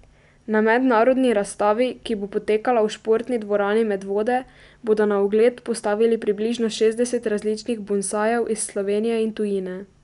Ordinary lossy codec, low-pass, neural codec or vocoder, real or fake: none; 10.8 kHz; none; real